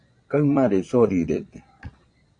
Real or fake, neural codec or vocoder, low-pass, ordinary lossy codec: fake; vocoder, 22.05 kHz, 80 mel bands, Vocos; 9.9 kHz; AAC, 48 kbps